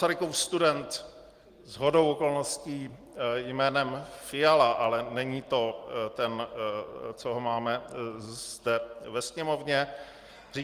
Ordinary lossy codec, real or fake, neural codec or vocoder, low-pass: Opus, 24 kbps; real; none; 14.4 kHz